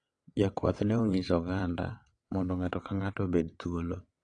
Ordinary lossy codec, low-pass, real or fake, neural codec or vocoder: AAC, 48 kbps; 9.9 kHz; fake; vocoder, 22.05 kHz, 80 mel bands, WaveNeXt